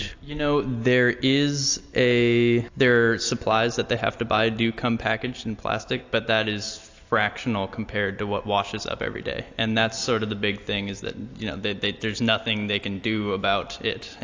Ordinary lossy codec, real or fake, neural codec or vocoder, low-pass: AAC, 48 kbps; real; none; 7.2 kHz